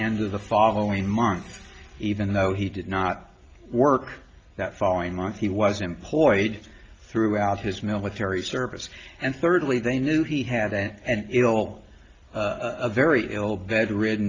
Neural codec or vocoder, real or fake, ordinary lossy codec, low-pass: none; real; Opus, 24 kbps; 7.2 kHz